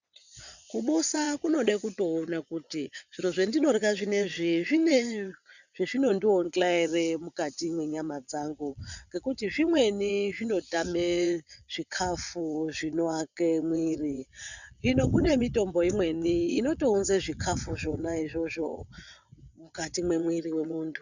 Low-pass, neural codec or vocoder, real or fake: 7.2 kHz; vocoder, 22.05 kHz, 80 mel bands, WaveNeXt; fake